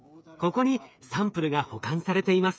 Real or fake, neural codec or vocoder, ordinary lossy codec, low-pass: fake; codec, 16 kHz, 8 kbps, FreqCodec, smaller model; none; none